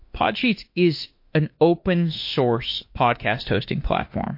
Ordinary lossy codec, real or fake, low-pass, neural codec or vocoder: MP3, 32 kbps; fake; 5.4 kHz; autoencoder, 48 kHz, 32 numbers a frame, DAC-VAE, trained on Japanese speech